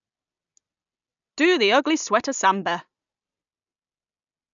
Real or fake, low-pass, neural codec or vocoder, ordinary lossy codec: real; 7.2 kHz; none; none